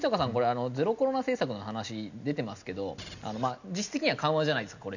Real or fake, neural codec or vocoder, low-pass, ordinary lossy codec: real; none; 7.2 kHz; none